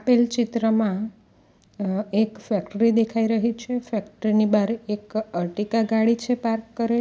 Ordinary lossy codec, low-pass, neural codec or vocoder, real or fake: none; none; none; real